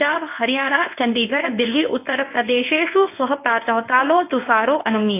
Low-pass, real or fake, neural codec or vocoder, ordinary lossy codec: 3.6 kHz; fake; codec, 24 kHz, 0.9 kbps, WavTokenizer, medium speech release version 1; AAC, 24 kbps